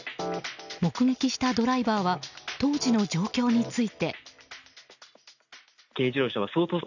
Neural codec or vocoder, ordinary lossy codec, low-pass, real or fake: none; none; 7.2 kHz; real